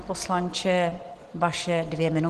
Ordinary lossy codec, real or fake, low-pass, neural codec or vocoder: Opus, 16 kbps; real; 10.8 kHz; none